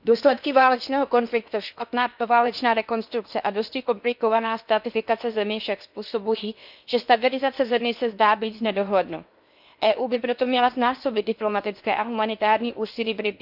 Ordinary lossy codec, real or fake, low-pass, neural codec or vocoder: none; fake; 5.4 kHz; codec, 16 kHz in and 24 kHz out, 0.8 kbps, FocalCodec, streaming, 65536 codes